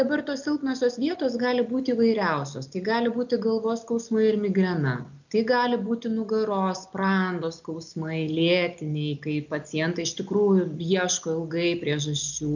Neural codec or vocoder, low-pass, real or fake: none; 7.2 kHz; real